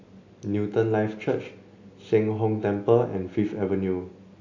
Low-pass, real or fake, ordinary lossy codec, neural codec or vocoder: 7.2 kHz; real; AAC, 48 kbps; none